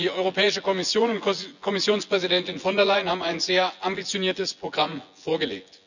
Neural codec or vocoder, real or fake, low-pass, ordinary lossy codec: vocoder, 24 kHz, 100 mel bands, Vocos; fake; 7.2 kHz; none